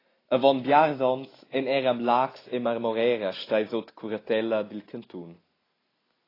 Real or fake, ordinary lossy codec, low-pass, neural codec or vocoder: real; AAC, 24 kbps; 5.4 kHz; none